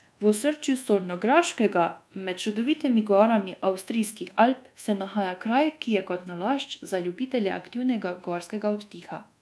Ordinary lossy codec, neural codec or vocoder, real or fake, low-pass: none; codec, 24 kHz, 1.2 kbps, DualCodec; fake; none